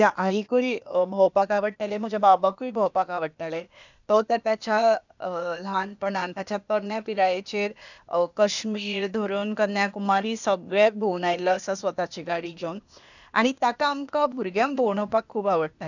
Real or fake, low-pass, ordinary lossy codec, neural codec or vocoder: fake; 7.2 kHz; none; codec, 16 kHz, 0.8 kbps, ZipCodec